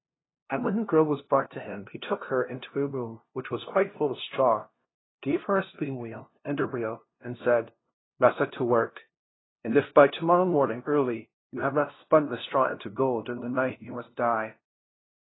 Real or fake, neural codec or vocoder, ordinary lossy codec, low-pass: fake; codec, 16 kHz, 0.5 kbps, FunCodec, trained on LibriTTS, 25 frames a second; AAC, 16 kbps; 7.2 kHz